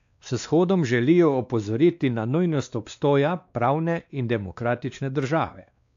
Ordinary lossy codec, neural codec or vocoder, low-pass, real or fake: AAC, 64 kbps; codec, 16 kHz, 2 kbps, X-Codec, WavLM features, trained on Multilingual LibriSpeech; 7.2 kHz; fake